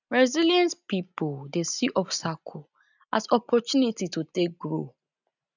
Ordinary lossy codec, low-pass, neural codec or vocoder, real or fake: none; 7.2 kHz; none; real